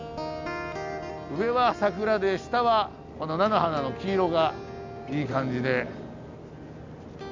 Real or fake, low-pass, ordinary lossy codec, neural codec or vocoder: real; 7.2 kHz; MP3, 64 kbps; none